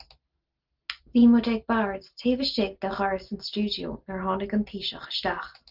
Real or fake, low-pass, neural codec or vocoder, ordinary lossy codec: real; 5.4 kHz; none; Opus, 24 kbps